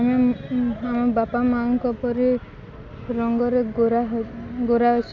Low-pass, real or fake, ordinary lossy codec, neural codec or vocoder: 7.2 kHz; real; none; none